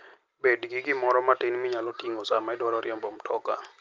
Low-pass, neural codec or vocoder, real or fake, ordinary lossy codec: 7.2 kHz; none; real; Opus, 32 kbps